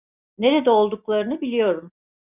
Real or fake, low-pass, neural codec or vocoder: real; 3.6 kHz; none